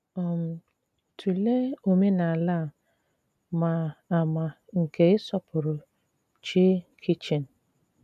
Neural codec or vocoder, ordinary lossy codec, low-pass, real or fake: none; none; 14.4 kHz; real